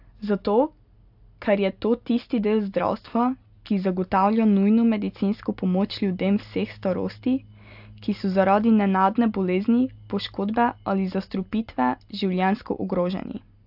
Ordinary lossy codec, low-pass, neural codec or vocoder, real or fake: AAC, 48 kbps; 5.4 kHz; none; real